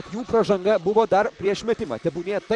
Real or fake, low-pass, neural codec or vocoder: fake; 10.8 kHz; vocoder, 44.1 kHz, 128 mel bands, Pupu-Vocoder